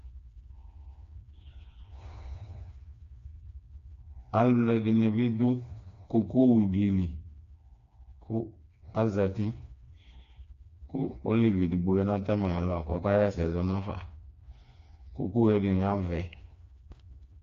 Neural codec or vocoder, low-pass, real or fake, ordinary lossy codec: codec, 16 kHz, 2 kbps, FreqCodec, smaller model; 7.2 kHz; fake; AAC, 48 kbps